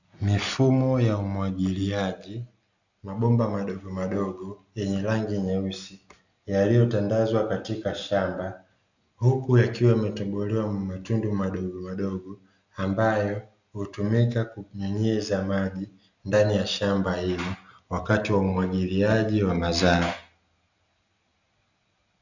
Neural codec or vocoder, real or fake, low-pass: none; real; 7.2 kHz